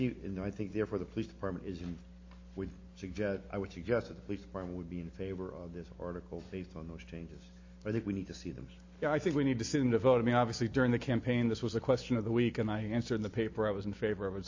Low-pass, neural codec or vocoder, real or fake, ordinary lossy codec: 7.2 kHz; none; real; MP3, 32 kbps